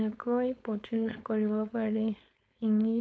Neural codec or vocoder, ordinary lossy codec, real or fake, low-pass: codec, 16 kHz, 4.8 kbps, FACodec; none; fake; none